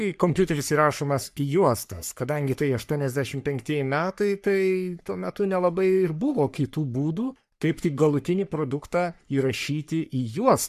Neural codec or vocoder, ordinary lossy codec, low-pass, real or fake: codec, 44.1 kHz, 3.4 kbps, Pupu-Codec; MP3, 96 kbps; 14.4 kHz; fake